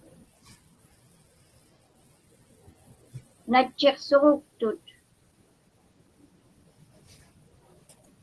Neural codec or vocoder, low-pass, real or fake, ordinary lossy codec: none; 10.8 kHz; real; Opus, 16 kbps